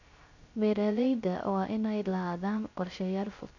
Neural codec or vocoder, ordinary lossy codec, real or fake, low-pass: codec, 16 kHz, 0.3 kbps, FocalCodec; none; fake; 7.2 kHz